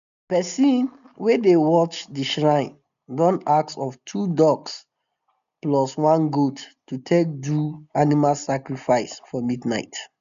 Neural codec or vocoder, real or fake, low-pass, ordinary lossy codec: none; real; 7.2 kHz; none